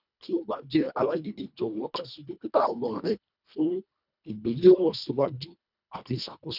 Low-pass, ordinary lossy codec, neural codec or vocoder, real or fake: 5.4 kHz; none; codec, 24 kHz, 1.5 kbps, HILCodec; fake